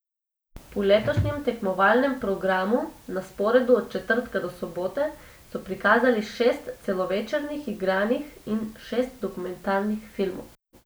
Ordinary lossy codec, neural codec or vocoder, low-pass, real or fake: none; none; none; real